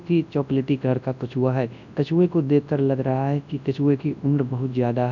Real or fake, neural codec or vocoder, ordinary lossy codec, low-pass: fake; codec, 24 kHz, 0.9 kbps, WavTokenizer, large speech release; none; 7.2 kHz